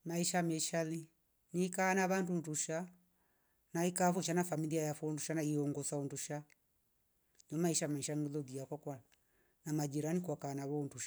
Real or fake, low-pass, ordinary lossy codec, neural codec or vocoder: real; none; none; none